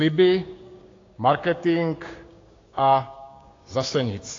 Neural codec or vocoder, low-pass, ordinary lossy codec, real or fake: codec, 16 kHz, 6 kbps, DAC; 7.2 kHz; AAC, 32 kbps; fake